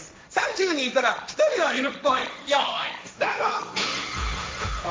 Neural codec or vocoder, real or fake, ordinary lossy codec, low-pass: codec, 16 kHz, 1.1 kbps, Voila-Tokenizer; fake; none; none